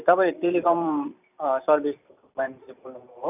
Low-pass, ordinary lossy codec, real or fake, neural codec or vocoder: 3.6 kHz; none; fake; vocoder, 44.1 kHz, 128 mel bands every 256 samples, BigVGAN v2